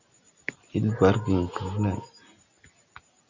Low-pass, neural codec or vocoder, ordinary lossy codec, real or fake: 7.2 kHz; none; Opus, 64 kbps; real